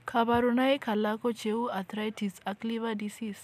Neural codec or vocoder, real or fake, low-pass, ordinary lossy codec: none; real; 14.4 kHz; none